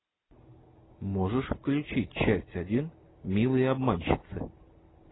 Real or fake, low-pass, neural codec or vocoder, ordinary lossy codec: real; 7.2 kHz; none; AAC, 16 kbps